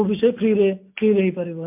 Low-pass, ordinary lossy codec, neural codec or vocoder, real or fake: 3.6 kHz; MP3, 32 kbps; none; real